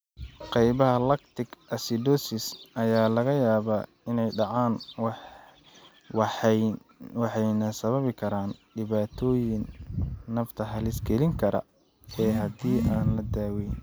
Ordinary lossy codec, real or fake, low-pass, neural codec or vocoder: none; real; none; none